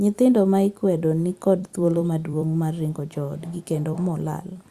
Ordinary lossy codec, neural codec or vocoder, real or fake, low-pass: Opus, 64 kbps; vocoder, 44.1 kHz, 128 mel bands every 256 samples, BigVGAN v2; fake; 14.4 kHz